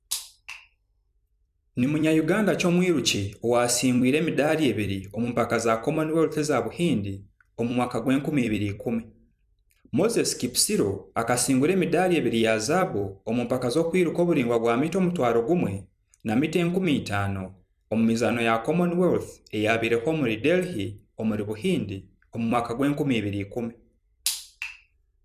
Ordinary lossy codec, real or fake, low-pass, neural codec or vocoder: none; fake; 14.4 kHz; vocoder, 44.1 kHz, 128 mel bands every 256 samples, BigVGAN v2